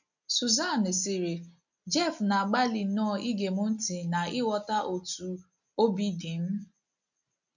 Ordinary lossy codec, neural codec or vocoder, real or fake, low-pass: none; none; real; 7.2 kHz